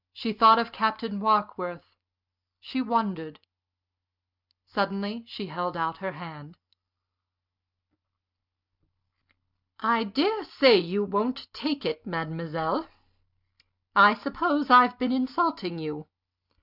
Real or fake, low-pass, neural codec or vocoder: real; 5.4 kHz; none